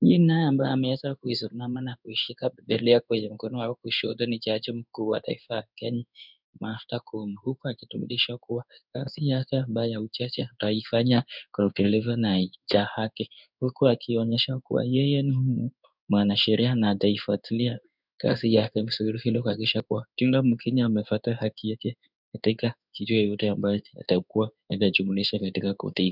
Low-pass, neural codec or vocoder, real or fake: 5.4 kHz; codec, 16 kHz in and 24 kHz out, 1 kbps, XY-Tokenizer; fake